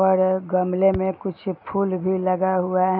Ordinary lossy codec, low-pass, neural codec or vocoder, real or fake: none; 5.4 kHz; none; real